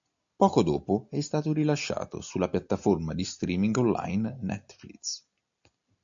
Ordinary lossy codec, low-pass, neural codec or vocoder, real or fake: AAC, 64 kbps; 7.2 kHz; none; real